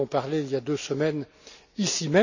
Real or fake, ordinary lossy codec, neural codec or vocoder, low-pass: real; none; none; 7.2 kHz